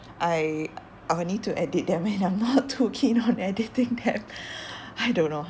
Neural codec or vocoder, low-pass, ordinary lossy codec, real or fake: none; none; none; real